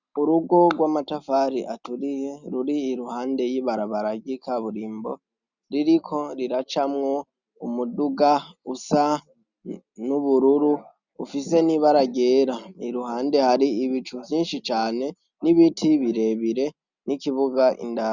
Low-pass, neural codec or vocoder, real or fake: 7.2 kHz; none; real